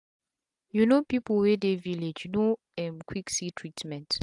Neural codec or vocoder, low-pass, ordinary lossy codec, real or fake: none; 10.8 kHz; AAC, 64 kbps; real